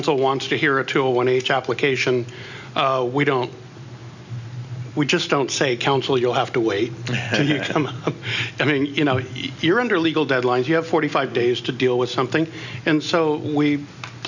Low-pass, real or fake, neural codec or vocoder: 7.2 kHz; real; none